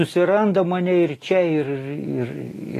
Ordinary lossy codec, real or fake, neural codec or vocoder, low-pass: AAC, 48 kbps; real; none; 14.4 kHz